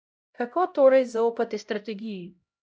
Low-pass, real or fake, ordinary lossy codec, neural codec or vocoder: none; fake; none; codec, 16 kHz, 0.5 kbps, X-Codec, HuBERT features, trained on LibriSpeech